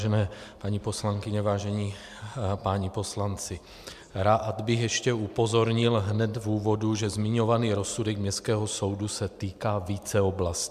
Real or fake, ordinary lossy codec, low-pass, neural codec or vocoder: real; MP3, 96 kbps; 14.4 kHz; none